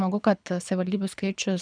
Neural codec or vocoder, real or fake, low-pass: codec, 24 kHz, 6 kbps, HILCodec; fake; 9.9 kHz